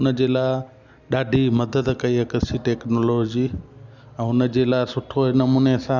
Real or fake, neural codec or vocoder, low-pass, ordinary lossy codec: real; none; 7.2 kHz; none